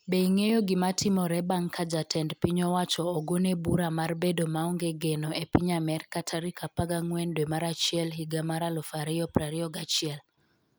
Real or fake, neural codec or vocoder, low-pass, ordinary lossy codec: real; none; none; none